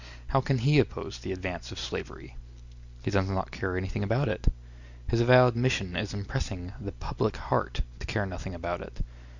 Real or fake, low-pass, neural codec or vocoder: real; 7.2 kHz; none